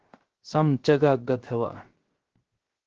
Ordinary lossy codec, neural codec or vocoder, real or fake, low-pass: Opus, 16 kbps; codec, 16 kHz, 0.3 kbps, FocalCodec; fake; 7.2 kHz